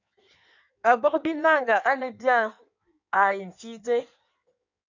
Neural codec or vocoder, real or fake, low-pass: codec, 16 kHz in and 24 kHz out, 1.1 kbps, FireRedTTS-2 codec; fake; 7.2 kHz